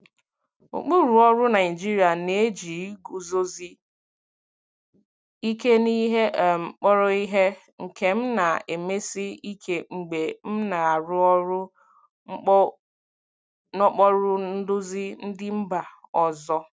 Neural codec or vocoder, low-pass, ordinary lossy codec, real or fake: none; none; none; real